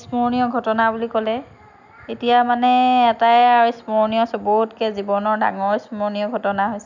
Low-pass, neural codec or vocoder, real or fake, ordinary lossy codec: 7.2 kHz; none; real; none